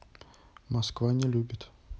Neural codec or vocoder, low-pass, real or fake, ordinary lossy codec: none; none; real; none